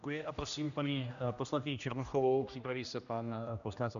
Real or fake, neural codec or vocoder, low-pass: fake; codec, 16 kHz, 1 kbps, X-Codec, HuBERT features, trained on general audio; 7.2 kHz